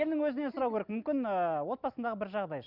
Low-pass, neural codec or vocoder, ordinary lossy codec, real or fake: 5.4 kHz; none; none; real